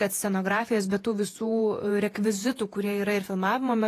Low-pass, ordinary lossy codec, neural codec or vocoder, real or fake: 14.4 kHz; AAC, 48 kbps; vocoder, 48 kHz, 128 mel bands, Vocos; fake